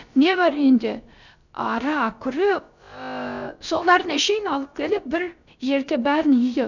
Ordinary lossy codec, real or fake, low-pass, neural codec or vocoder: none; fake; 7.2 kHz; codec, 16 kHz, about 1 kbps, DyCAST, with the encoder's durations